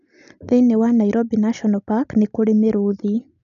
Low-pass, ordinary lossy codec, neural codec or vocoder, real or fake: 7.2 kHz; none; none; real